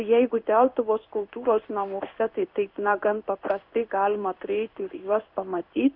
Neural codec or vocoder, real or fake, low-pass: codec, 16 kHz in and 24 kHz out, 1 kbps, XY-Tokenizer; fake; 5.4 kHz